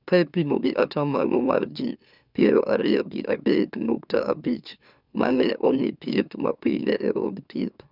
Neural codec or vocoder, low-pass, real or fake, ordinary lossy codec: autoencoder, 44.1 kHz, a latent of 192 numbers a frame, MeloTTS; 5.4 kHz; fake; none